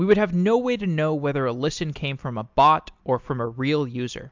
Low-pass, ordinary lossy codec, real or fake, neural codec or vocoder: 7.2 kHz; MP3, 64 kbps; real; none